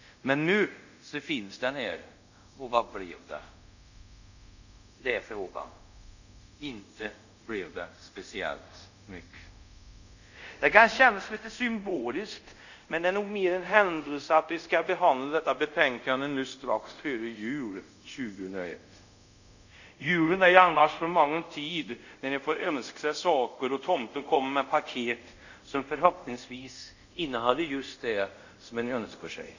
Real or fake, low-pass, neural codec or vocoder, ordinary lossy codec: fake; 7.2 kHz; codec, 24 kHz, 0.5 kbps, DualCodec; none